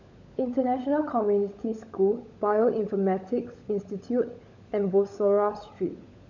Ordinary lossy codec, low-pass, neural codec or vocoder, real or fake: none; 7.2 kHz; codec, 16 kHz, 16 kbps, FunCodec, trained on LibriTTS, 50 frames a second; fake